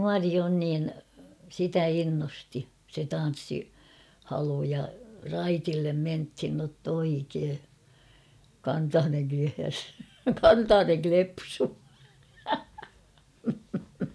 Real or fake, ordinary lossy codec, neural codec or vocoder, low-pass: real; none; none; none